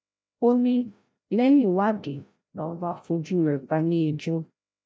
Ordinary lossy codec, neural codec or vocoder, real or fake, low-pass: none; codec, 16 kHz, 0.5 kbps, FreqCodec, larger model; fake; none